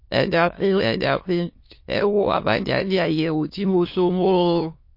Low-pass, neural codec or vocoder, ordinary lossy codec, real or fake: 5.4 kHz; autoencoder, 22.05 kHz, a latent of 192 numbers a frame, VITS, trained on many speakers; MP3, 32 kbps; fake